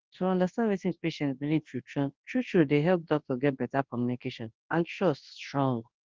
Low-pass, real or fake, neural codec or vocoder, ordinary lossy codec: 7.2 kHz; fake; codec, 24 kHz, 0.9 kbps, WavTokenizer, large speech release; Opus, 16 kbps